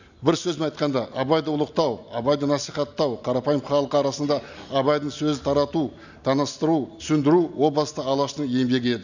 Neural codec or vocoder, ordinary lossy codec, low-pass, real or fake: none; none; 7.2 kHz; real